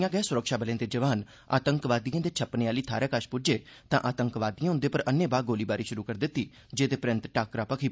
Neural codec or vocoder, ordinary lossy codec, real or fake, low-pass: none; none; real; none